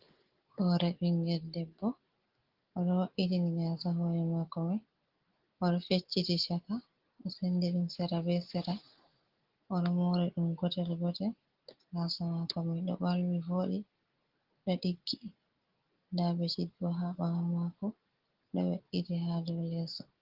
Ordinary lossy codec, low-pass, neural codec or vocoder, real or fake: Opus, 16 kbps; 5.4 kHz; none; real